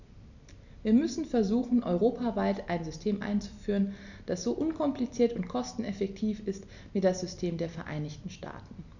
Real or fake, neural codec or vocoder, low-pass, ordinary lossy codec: real; none; 7.2 kHz; none